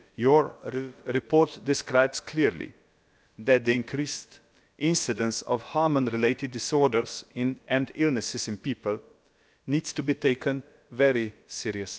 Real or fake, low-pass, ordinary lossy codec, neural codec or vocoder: fake; none; none; codec, 16 kHz, about 1 kbps, DyCAST, with the encoder's durations